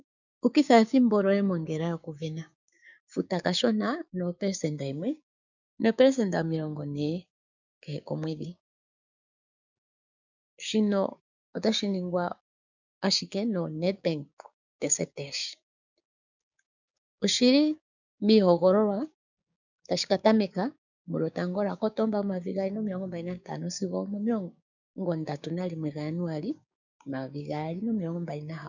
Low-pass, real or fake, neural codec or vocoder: 7.2 kHz; fake; codec, 16 kHz, 6 kbps, DAC